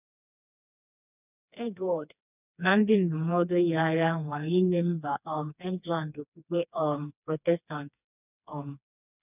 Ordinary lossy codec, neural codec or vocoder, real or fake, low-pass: none; codec, 16 kHz, 2 kbps, FreqCodec, smaller model; fake; 3.6 kHz